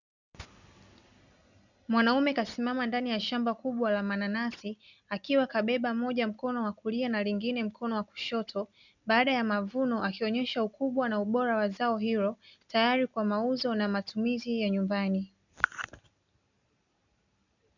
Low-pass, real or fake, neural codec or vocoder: 7.2 kHz; real; none